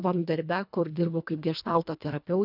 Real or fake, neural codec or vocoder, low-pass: fake; codec, 24 kHz, 1.5 kbps, HILCodec; 5.4 kHz